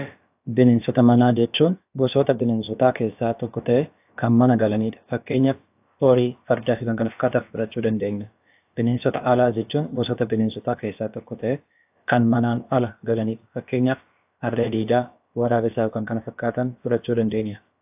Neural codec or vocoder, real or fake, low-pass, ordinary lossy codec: codec, 16 kHz, about 1 kbps, DyCAST, with the encoder's durations; fake; 3.6 kHz; AAC, 32 kbps